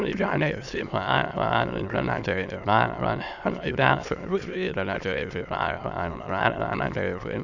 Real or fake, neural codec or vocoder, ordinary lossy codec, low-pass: fake; autoencoder, 22.05 kHz, a latent of 192 numbers a frame, VITS, trained on many speakers; none; 7.2 kHz